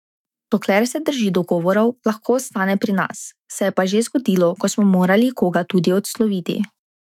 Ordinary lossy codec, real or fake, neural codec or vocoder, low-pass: none; fake; autoencoder, 48 kHz, 128 numbers a frame, DAC-VAE, trained on Japanese speech; 19.8 kHz